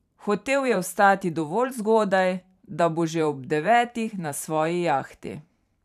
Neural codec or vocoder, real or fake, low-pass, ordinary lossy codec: vocoder, 44.1 kHz, 128 mel bands every 512 samples, BigVGAN v2; fake; 14.4 kHz; none